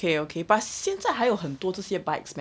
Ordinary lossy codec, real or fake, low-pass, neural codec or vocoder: none; real; none; none